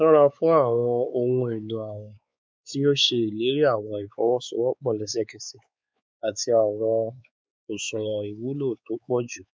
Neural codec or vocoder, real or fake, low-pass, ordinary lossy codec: codec, 16 kHz, 4 kbps, X-Codec, WavLM features, trained on Multilingual LibriSpeech; fake; none; none